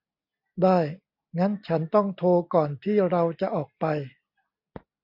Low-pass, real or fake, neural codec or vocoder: 5.4 kHz; real; none